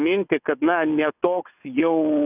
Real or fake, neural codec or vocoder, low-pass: fake; vocoder, 22.05 kHz, 80 mel bands, WaveNeXt; 3.6 kHz